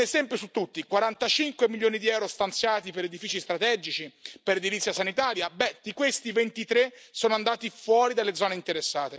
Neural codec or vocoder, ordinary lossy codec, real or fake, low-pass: none; none; real; none